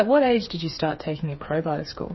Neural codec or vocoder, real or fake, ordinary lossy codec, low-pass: codec, 16 kHz, 4 kbps, FreqCodec, smaller model; fake; MP3, 24 kbps; 7.2 kHz